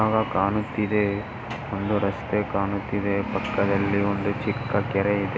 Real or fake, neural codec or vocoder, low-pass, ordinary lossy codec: real; none; none; none